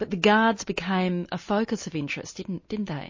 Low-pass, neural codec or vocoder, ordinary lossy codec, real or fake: 7.2 kHz; none; MP3, 32 kbps; real